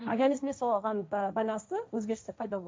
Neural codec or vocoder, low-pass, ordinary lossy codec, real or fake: codec, 16 kHz, 1.1 kbps, Voila-Tokenizer; none; none; fake